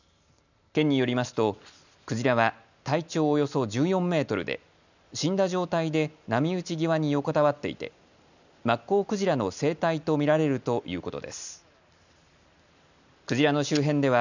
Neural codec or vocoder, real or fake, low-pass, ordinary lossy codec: none; real; 7.2 kHz; none